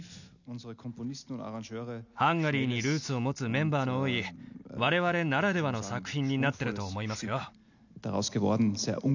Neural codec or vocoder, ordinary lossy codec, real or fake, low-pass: none; none; real; 7.2 kHz